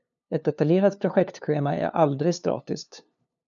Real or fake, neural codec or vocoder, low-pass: fake; codec, 16 kHz, 2 kbps, FunCodec, trained on LibriTTS, 25 frames a second; 7.2 kHz